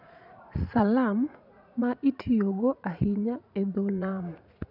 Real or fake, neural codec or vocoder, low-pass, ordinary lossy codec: fake; vocoder, 44.1 kHz, 80 mel bands, Vocos; 5.4 kHz; none